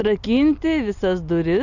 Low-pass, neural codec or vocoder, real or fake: 7.2 kHz; none; real